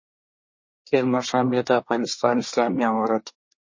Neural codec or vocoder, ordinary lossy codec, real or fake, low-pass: codec, 24 kHz, 1 kbps, SNAC; MP3, 32 kbps; fake; 7.2 kHz